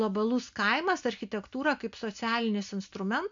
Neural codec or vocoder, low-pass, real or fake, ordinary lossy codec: none; 7.2 kHz; real; MP3, 64 kbps